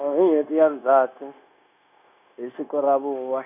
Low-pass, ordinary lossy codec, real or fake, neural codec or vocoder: 3.6 kHz; none; fake; codec, 16 kHz, 0.9 kbps, LongCat-Audio-Codec